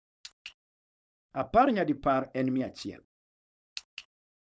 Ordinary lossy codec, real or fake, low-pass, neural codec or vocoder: none; fake; none; codec, 16 kHz, 4.8 kbps, FACodec